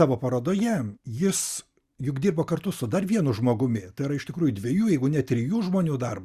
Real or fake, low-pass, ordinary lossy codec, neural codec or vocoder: real; 14.4 kHz; Opus, 64 kbps; none